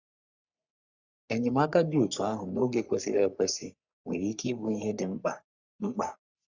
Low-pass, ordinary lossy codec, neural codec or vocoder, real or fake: 7.2 kHz; Opus, 64 kbps; codec, 44.1 kHz, 3.4 kbps, Pupu-Codec; fake